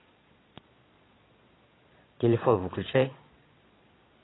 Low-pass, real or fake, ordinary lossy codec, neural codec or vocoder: 7.2 kHz; fake; AAC, 16 kbps; vocoder, 22.05 kHz, 80 mel bands, Vocos